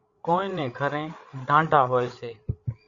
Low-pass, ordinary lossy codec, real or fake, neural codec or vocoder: 7.2 kHz; Opus, 64 kbps; fake; codec, 16 kHz, 8 kbps, FreqCodec, larger model